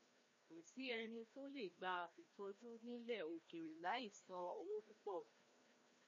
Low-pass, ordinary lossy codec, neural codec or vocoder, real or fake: 7.2 kHz; MP3, 32 kbps; codec, 16 kHz, 1 kbps, FreqCodec, larger model; fake